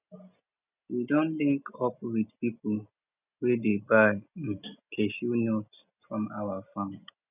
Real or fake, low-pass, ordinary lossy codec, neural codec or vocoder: real; 3.6 kHz; none; none